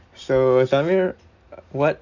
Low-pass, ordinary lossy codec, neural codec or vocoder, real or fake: 7.2 kHz; none; none; real